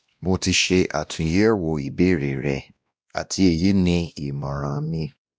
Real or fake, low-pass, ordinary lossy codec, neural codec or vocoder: fake; none; none; codec, 16 kHz, 1 kbps, X-Codec, WavLM features, trained on Multilingual LibriSpeech